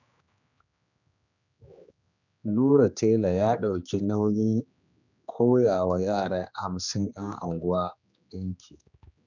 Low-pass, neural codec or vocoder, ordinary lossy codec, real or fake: 7.2 kHz; codec, 16 kHz, 2 kbps, X-Codec, HuBERT features, trained on general audio; none; fake